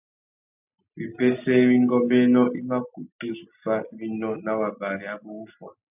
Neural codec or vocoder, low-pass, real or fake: none; 3.6 kHz; real